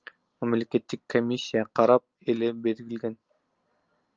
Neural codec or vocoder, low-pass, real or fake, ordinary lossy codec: none; 7.2 kHz; real; Opus, 24 kbps